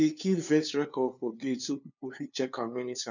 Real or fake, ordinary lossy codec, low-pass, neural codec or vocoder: fake; none; 7.2 kHz; codec, 16 kHz, 2 kbps, FunCodec, trained on LibriTTS, 25 frames a second